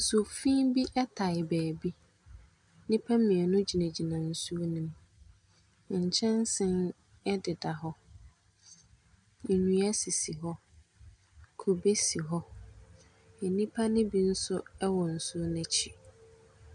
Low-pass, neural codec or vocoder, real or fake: 10.8 kHz; none; real